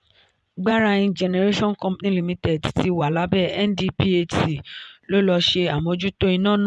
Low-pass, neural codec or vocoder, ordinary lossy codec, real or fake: none; vocoder, 24 kHz, 100 mel bands, Vocos; none; fake